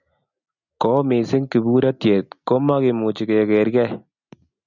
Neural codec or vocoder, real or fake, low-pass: none; real; 7.2 kHz